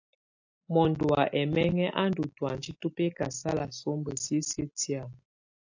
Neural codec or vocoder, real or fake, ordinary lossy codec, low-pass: none; real; AAC, 48 kbps; 7.2 kHz